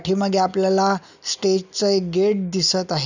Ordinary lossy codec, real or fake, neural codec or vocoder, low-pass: none; real; none; 7.2 kHz